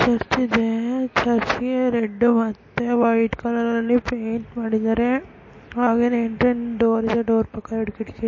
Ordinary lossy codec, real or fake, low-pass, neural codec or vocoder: MP3, 32 kbps; real; 7.2 kHz; none